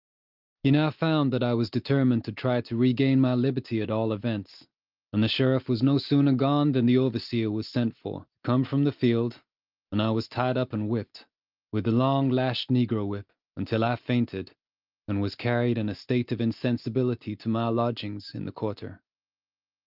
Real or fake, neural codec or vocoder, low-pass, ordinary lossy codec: real; none; 5.4 kHz; Opus, 24 kbps